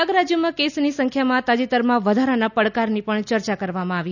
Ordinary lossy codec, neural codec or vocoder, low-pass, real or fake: none; none; 7.2 kHz; real